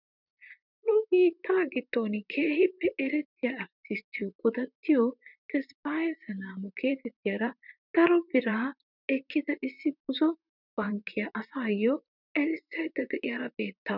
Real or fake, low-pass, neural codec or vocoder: fake; 5.4 kHz; vocoder, 44.1 kHz, 128 mel bands, Pupu-Vocoder